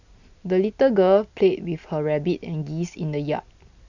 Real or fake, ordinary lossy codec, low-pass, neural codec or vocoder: real; none; 7.2 kHz; none